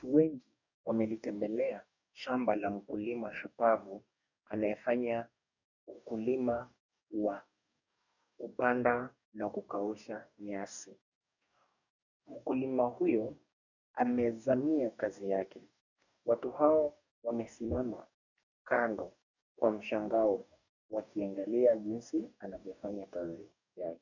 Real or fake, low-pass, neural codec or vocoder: fake; 7.2 kHz; codec, 44.1 kHz, 2.6 kbps, DAC